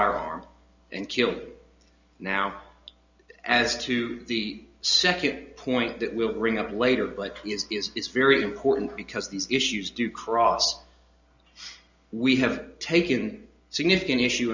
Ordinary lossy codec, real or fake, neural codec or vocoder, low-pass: Opus, 64 kbps; real; none; 7.2 kHz